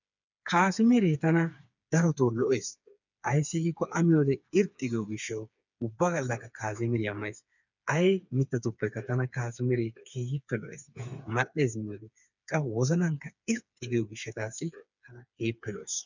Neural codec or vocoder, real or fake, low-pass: codec, 16 kHz, 4 kbps, FreqCodec, smaller model; fake; 7.2 kHz